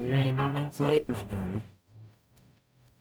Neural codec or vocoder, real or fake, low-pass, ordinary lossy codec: codec, 44.1 kHz, 0.9 kbps, DAC; fake; none; none